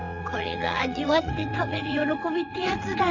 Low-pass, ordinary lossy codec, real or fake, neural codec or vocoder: 7.2 kHz; none; fake; vocoder, 22.05 kHz, 80 mel bands, WaveNeXt